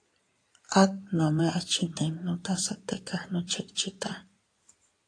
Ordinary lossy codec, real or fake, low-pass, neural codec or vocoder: AAC, 48 kbps; fake; 9.9 kHz; codec, 16 kHz in and 24 kHz out, 2.2 kbps, FireRedTTS-2 codec